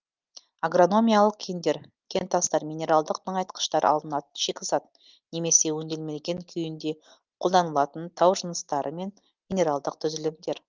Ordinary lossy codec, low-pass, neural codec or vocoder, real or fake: Opus, 24 kbps; 7.2 kHz; none; real